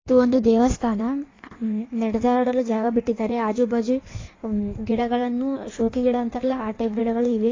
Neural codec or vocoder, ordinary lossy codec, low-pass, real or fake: codec, 16 kHz in and 24 kHz out, 1.1 kbps, FireRedTTS-2 codec; AAC, 32 kbps; 7.2 kHz; fake